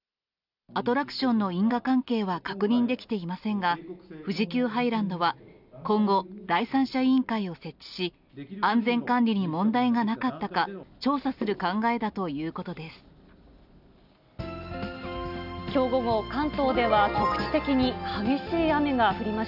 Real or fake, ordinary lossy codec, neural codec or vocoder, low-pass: real; none; none; 5.4 kHz